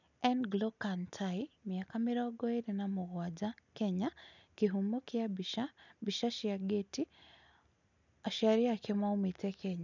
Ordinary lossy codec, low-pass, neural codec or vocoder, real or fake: none; 7.2 kHz; none; real